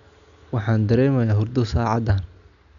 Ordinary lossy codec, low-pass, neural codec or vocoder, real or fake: none; 7.2 kHz; none; real